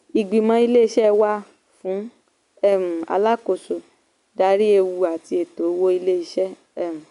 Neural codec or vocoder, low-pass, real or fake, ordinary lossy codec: none; 10.8 kHz; real; none